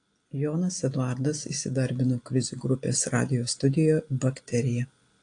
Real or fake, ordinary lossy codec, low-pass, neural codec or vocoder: real; AAC, 48 kbps; 9.9 kHz; none